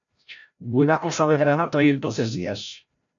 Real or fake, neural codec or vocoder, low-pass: fake; codec, 16 kHz, 0.5 kbps, FreqCodec, larger model; 7.2 kHz